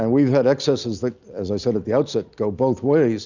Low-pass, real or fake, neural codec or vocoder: 7.2 kHz; real; none